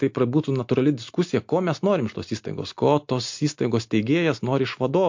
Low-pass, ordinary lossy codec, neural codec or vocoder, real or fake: 7.2 kHz; MP3, 48 kbps; none; real